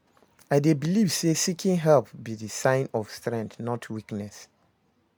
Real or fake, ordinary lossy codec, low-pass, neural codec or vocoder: real; none; none; none